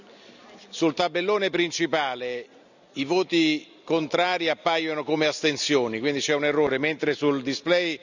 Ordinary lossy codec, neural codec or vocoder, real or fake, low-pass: none; none; real; 7.2 kHz